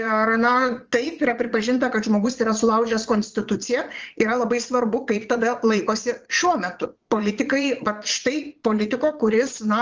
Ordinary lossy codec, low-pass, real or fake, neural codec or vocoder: Opus, 24 kbps; 7.2 kHz; fake; vocoder, 22.05 kHz, 80 mel bands, Vocos